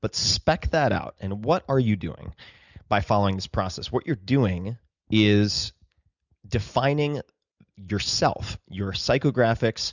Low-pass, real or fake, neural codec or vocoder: 7.2 kHz; real; none